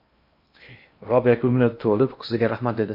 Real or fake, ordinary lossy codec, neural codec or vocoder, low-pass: fake; MP3, 32 kbps; codec, 16 kHz in and 24 kHz out, 0.8 kbps, FocalCodec, streaming, 65536 codes; 5.4 kHz